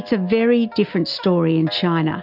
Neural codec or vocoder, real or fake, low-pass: none; real; 5.4 kHz